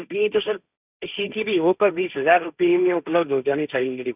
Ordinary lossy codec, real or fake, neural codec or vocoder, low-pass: none; fake; codec, 16 kHz, 1.1 kbps, Voila-Tokenizer; 3.6 kHz